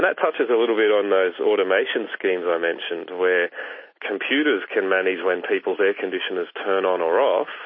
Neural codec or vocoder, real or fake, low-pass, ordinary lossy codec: none; real; 7.2 kHz; MP3, 24 kbps